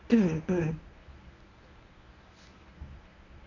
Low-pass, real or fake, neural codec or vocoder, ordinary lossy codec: 7.2 kHz; fake; codec, 24 kHz, 0.9 kbps, WavTokenizer, medium speech release version 1; none